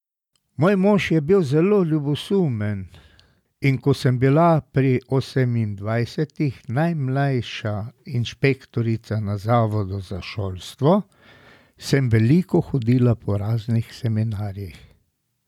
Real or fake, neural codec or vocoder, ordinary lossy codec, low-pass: real; none; none; 19.8 kHz